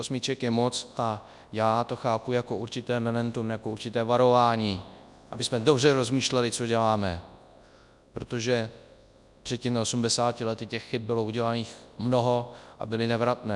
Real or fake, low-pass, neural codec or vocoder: fake; 10.8 kHz; codec, 24 kHz, 0.9 kbps, WavTokenizer, large speech release